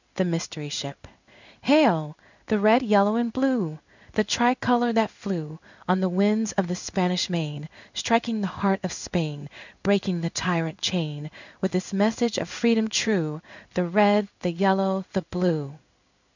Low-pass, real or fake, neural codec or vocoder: 7.2 kHz; fake; codec, 16 kHz in and 24 kHz out, 1 kbps, XY-Tokenizer